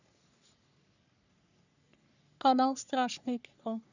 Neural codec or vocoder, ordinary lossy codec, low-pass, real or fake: codec, 44.1 kHz, 3.4 kbps, Pupu-Codec; none; 7.2 kHz; fake